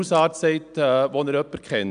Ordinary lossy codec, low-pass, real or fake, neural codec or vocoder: none; 9.9 kHz; real; none